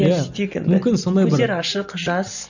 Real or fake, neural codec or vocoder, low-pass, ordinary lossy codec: real; none; 7.2 kHz; none